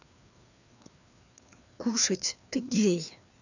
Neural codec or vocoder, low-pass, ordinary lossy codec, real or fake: codec, 16 kHz, 2 kbps, FreqCodec, larger model; 7.2 kHz; none; fake